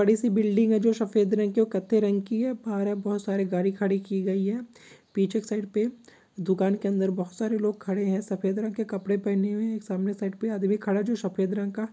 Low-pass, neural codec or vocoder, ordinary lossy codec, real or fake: none; none; none; real